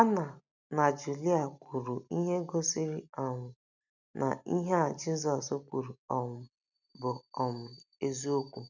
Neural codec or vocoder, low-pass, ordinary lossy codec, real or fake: none; 7.2 kHz; none; real